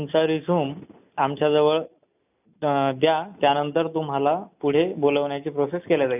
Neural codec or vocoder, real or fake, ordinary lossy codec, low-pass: none; real; none; 3.6 kHz